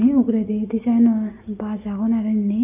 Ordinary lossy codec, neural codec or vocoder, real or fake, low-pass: none; none; real; 3.6 kHz